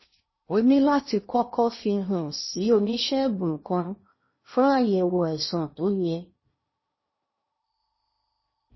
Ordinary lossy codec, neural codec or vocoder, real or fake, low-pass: MP3, 24 kbps; codec, 16 kHz in and 24 kHz out, 0.6 kbps, FocalCodec, streaming, 2048 codes; fake; 7.2 kHz